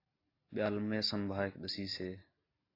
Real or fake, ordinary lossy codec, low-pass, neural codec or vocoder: real; AAC, 24 kbps; 5.4 kHz; none